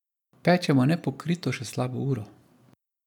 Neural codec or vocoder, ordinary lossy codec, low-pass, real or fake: none; none; 19.8 kHz; real